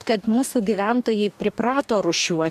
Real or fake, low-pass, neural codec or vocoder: fake; 14.4 kHz; codec, 44.1 kHz, 2.6 kbps, DAC